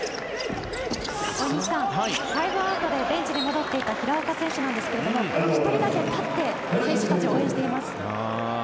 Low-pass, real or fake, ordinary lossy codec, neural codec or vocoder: none; real; none; none